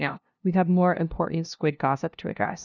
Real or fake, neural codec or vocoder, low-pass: fake; codec, 16 kHz, 0.5 kbps, FunCodec, trained on LibriTTS, 25 frames a second; 7.2 kHz